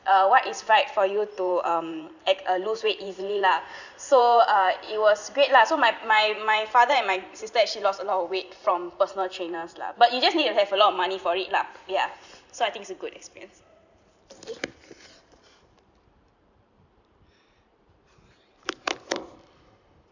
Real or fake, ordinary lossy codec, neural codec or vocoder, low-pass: fake; none; vocoder, 44.1 kHz, 128 mel bands every 512 samples, BigVGAN v2; 7.2 kHz